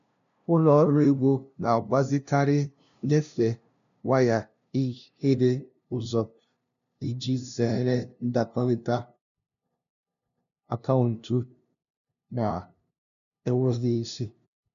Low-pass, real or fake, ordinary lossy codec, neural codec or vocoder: 7.2 kHz; fake; none; codec, 16 kHz, 0.5 kbps, FunCodec, trained on LibriTTS, 25 frames a second